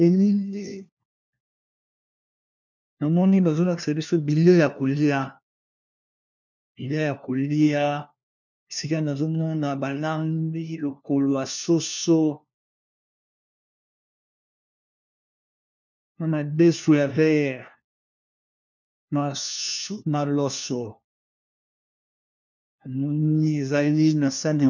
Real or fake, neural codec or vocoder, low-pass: fake; codec, 16 kHz, 1 kbps, FunCodec, trained on LibriTTS, 50 frames a second; 7.2 kHz